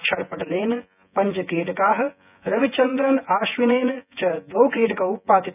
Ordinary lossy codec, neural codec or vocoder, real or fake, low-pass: none; vocoder, 24 kHz, 100 mel bands, Vocos; fake; 3.6 kHz